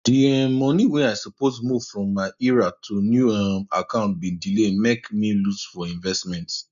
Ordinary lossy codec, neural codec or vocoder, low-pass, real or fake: none; none; 7.2 kHz; real